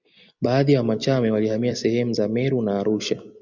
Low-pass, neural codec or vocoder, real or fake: 7.2 kHz; vocoder, 44.1 kHz, 128 mel bands every 256 samples, BigVGAN v2; fake